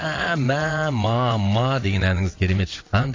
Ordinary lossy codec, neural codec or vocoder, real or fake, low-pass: AAC, 32 kbps; vocoder, 22.05 kHz, 80 mel bands, WaveNeXt; fake; 7.2 kHz